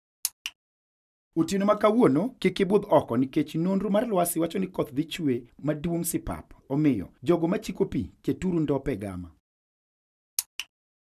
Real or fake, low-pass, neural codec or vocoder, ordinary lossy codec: real; 14.4 kHz; none; none